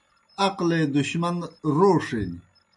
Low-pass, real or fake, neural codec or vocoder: 10.8 kHz; real; none